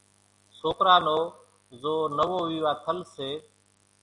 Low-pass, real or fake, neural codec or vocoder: 10.8 kHz; real; none